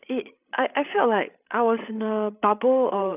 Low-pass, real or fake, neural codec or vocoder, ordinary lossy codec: 3.6 kHz; fake; codec, 16 kHz, 8 kbps, FreqCodec, larger model; none